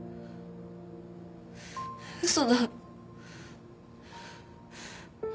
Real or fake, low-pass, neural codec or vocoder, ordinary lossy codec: real; none; none; none